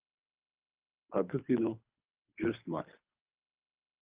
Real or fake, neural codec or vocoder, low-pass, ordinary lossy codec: fake; codec, 24 kHz, 3 kbps, HILCodec; 3.6 kHz; Opus, 24 kbps